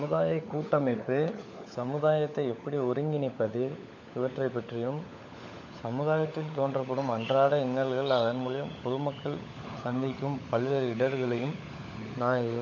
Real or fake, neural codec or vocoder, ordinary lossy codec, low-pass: fake; codec, 16 kHz, 16 kbps, FunCodec, trained on LibriTTS, 50 frames a second; MP3, 48 kbps; 7.2 kHz